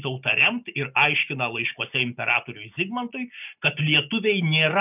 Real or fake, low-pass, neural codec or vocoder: real; 3.6 kHz; none